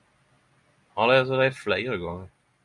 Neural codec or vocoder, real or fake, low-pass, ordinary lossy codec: none; real; 10.8 kHz; MP3, 96 kbps